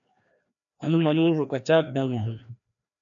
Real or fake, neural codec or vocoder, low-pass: fake; codec, 16 kHz, 1 kbps, FreqCodec, larger model; 7.2 kHz